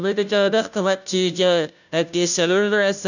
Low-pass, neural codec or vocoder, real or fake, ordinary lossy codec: 7.2 kHz; codec, 16 kHz, 0.5 kbps, FunCodec, trained on Chinese and English, 25 frames a second; fake; none